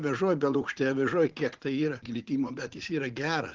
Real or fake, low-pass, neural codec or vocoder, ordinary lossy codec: fake; 7.2 kHz; vocoder, 44.1 kHz, 80 mel bands, Vocos; Opus, 16 kbps